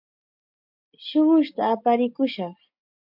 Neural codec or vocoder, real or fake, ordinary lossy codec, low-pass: none; real; MP3, 48 kbps; 5.4 kHz